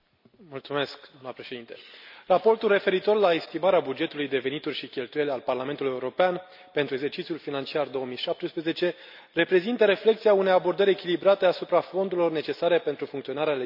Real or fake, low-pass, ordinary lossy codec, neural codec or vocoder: real; 5.4 kHz; none; none